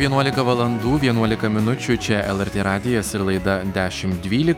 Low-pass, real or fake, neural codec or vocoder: 19.8 kHz; fake; autoencoder, 48 kHz, 128 numbers a frame, DAC-VAE, trained on Japanese speech